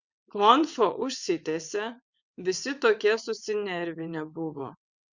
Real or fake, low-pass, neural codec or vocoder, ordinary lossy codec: fake; 7.2 kHz; vocoder, 22.05 kHz, 80 mel bands, WaveNeXt; Opus, 64 kbps